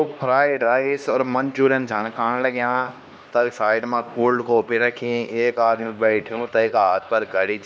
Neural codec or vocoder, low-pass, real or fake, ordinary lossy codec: codec, 16 kHz, 2 kbps, X-Codec, HuBERT features, trained on LibriSpeech; none; fake; none